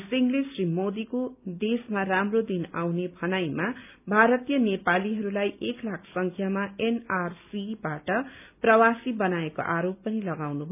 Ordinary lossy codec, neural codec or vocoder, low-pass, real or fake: none; none; 3.6 kHz; real